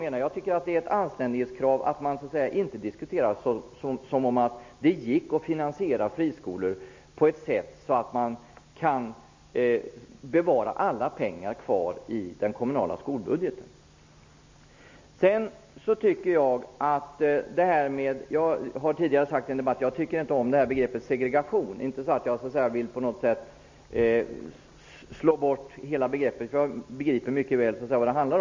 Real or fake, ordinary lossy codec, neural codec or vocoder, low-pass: real; MP3, 48 kbps; none; 7.2 kHz